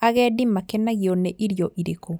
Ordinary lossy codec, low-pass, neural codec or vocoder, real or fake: none; none; none; real